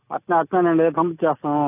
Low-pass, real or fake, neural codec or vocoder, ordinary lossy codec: 3.6 kHz; real; none; AAC, 24 kbps